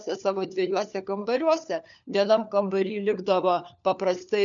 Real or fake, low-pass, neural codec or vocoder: fake; 7.2 kHz; codec, 16 kHz, 4 kbps, FunCodec, trained on LibriTTS, 50 frames a second